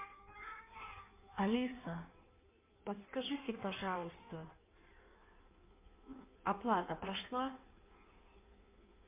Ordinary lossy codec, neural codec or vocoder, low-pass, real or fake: MP3, 24 kbps; codec, 16 kHz in and 24 kHz out, 1.1 kbps, FireRedTTS-2 codec; 3.6 kHz; fake